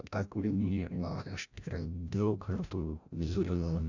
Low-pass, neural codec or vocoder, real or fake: 7.2 kHz; codec, 16 kHz, 0.5 kbps, FreqCodec, larger model; fake